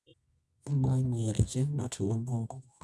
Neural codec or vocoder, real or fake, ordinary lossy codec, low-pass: codec, 24 kHz, 0.9 kbps, WavTokenizer, medium music audio release; fake; none; none